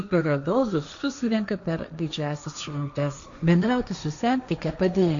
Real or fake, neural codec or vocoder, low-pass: fake; codec, 16 kHz, 1.1 kbps, Voila-Tokenizer; 7.2 kHz